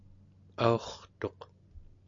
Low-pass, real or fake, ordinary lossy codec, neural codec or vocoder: 7.2 kHz; real; MP3, 64 kbps; none